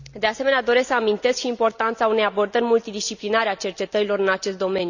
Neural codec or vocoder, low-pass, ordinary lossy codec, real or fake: none; 7.2 kHz; none; real